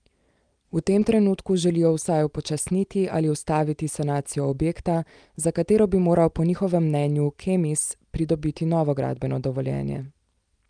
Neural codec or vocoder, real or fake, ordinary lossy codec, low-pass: none; real; Opus, 32 kbps; 9.9 kHz